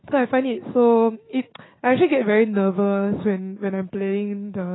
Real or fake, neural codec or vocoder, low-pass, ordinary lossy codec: fake; codec, 16 kHz, 6 kbps, DAC; 7.2 kHz; AAC, 16 kbps